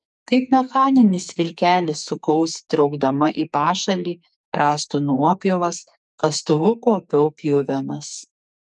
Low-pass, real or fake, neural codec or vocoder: 10.8 kHz; fake; codec, 44.1 kHz, 2.6 kbps, SNAC